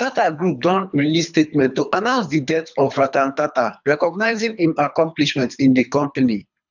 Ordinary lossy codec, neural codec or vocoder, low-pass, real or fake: none; codec, 24 kHz, 3 kbps, HILCodec; 7.2 kHz; fake